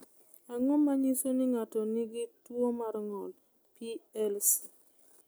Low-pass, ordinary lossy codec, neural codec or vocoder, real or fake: none; none; none; real